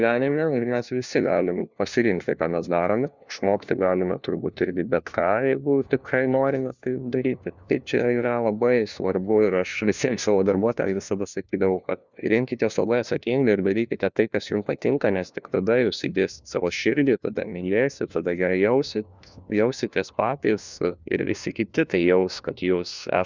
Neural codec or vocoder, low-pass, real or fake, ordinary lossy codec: codec, 16 kHz, 1 kbps, FunCodec, trained on LibriTTS, 50 frames a second; 7.2 kHz; fake; Opus, 64 kbps